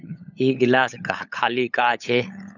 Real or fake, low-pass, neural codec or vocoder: fake; 7.2 kHz; codec, 16 kHz, 8 kbps, FunCodec, trained on LibriTTS, 25 frames a second